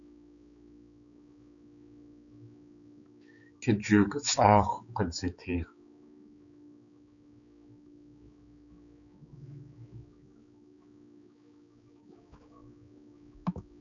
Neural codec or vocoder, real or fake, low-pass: codec, 16 kHz, 4 kbps, X-Codec, HuBERT features, trained on balanced general audio; fake; 7.2 kHz